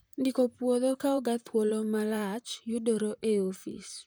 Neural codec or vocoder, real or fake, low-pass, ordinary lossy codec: vocoder, 44.1 kHz, 128 mel bands, Pupu-Vocoder; fake; none; none